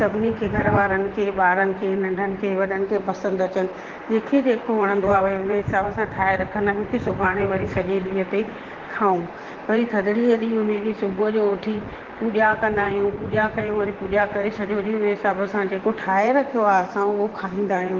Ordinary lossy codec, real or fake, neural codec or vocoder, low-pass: Opus, 16 kbps; fake; vocoder, 44.1 kHz, 128 mel bands, Pupu-Vocoder; 7.2 kHz